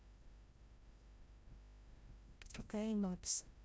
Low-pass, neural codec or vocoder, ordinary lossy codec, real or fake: none; codec, 16 kHz, 0.5 kbps, FreqCodec, larger model; none; fake